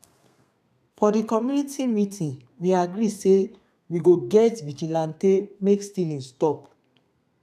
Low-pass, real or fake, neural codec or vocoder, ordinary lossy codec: 14.4 kHz; fake; codec, 32 kHz, 1.9 kbps, SNAC; none